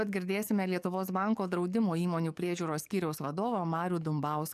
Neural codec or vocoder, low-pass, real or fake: codec, 44.1 kHz, 7.8 kbps, DAC; 14.4 kHz; fake